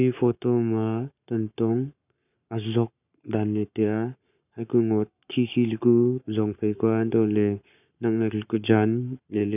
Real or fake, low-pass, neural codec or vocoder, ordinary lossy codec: fake; 3.6 kHz; codec, 44.1 kHz, 7.8 kbps, Pupu-Codec; none